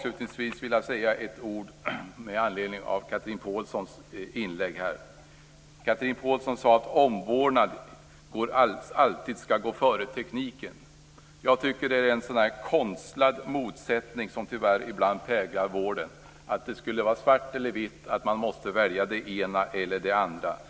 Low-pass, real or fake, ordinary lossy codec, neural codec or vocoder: none; real; none; none